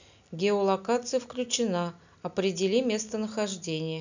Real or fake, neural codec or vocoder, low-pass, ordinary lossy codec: real; none; 7.2 kHz; none